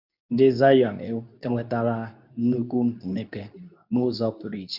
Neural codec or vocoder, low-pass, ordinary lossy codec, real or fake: codec, 24 kHz, 0.9 kbps, WavTokenizer, medium speech release version 2; 5.4 kHz; none; fake